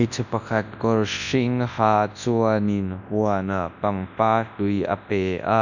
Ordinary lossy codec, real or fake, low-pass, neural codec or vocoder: none; fake; 7.2 kHz; codec, 24 kHz, 0.9 kbps, WavTokenizer, large speech release